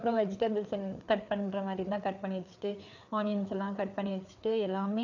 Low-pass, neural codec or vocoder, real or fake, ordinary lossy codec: 7.2 kHz; codec, 16 kHz, 4 kbps, FreqCodec, larger model; fake; none